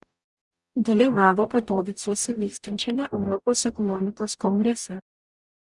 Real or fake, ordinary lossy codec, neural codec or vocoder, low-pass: fake; Opus, 64 kbps; codec, 44.1 kHz, 0.9 kbps, DAC; 10.8 kHz